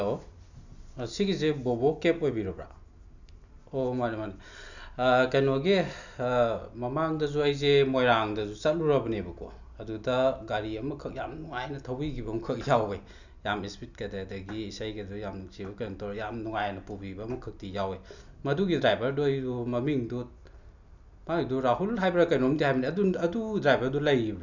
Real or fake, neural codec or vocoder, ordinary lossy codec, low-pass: real; none; none; 7.2 kHz